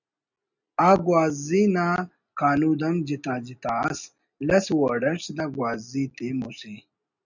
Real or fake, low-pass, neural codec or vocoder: real; 7.2 kHz; none